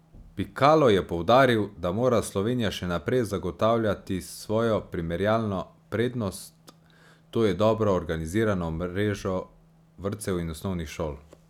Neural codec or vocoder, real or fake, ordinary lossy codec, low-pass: none; real; none; 19.8 kHz